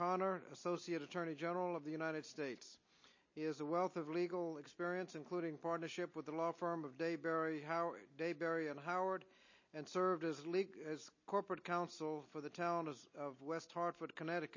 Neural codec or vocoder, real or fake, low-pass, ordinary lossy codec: none; real; 7.2 kHz; MP3, 32 kbps